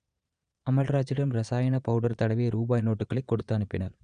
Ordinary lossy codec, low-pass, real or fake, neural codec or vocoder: none; 9.9 kHz; fake; vocoder, 22.05 kHz, 80 mel bands, Vocos